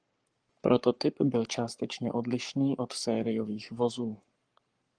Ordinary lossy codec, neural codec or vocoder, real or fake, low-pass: Opus, 24 kbps; codec, 44.1 kHz, 7.8 kbps, Pupu-Codec; fake; 9.9 kHz